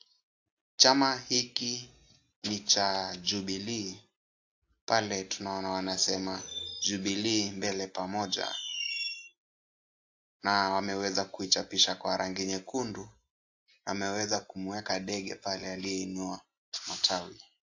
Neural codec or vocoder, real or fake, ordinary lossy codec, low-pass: none; real; AAC, 48 kbps; 7.2 kHz